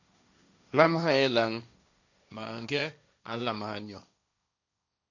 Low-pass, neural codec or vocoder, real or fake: 7.2 kHz; codec, 16 kHz, 1.1 kbps, Voila-Tokenizer; fake